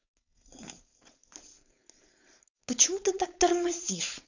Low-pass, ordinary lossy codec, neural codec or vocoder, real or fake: 7.2 kHz; none; codec, 16 kHz, 4.8 kbps, FACodec; fake